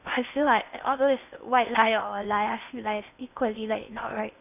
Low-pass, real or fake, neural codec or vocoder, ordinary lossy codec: 3.6 kHz; fake; codec, 16 kHz in and 24 kHz out, 0.6 kbps, FocalCodec, streaming, 2048 codes; none